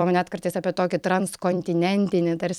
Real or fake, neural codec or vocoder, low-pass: fake; vocoder, 44.1 kHz, 128 mel bands every 256 samples, BigVGAN v2; 19.8 kHz